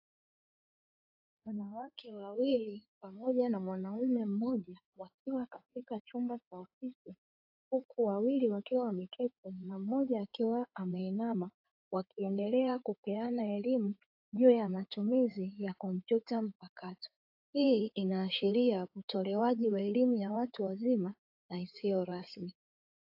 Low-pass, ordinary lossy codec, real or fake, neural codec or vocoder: 5.4 kHz; AAC, 48 kbps; fake; codec, 16 kHz in and 24 kHz out, 2.2 kbps, FireRedTTS-2 codec